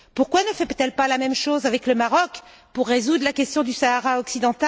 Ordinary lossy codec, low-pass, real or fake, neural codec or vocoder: none; none; real; none